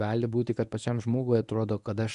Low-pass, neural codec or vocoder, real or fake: 10.8 kHz; codec, 24 kHz, 0.9 kbps, WavTokenizer, medium speech release version 1; fake